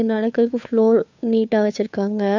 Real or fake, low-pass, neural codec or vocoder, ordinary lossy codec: fake; 7.2 kHz; codec, 16 kHz, 2 kbps, FunCodec, trained on Chinese and English, 25 frames a second; none